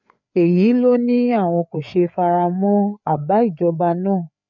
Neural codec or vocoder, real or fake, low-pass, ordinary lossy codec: codec, 16 kHz, 4 kbps, FreqCodec, larger model; fake; 7.2 kHz; none